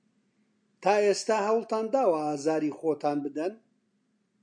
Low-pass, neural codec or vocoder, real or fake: 9.9 kHz; none; real